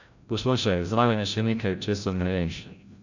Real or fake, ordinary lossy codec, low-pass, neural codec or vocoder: fake; none; 7.2 kHz; codec, 16 kHz, 0.5 kbps, FreqCodec, larger model